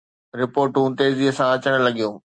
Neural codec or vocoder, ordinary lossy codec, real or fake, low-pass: none; Opus, 64 kbps; real; 9.9 kHz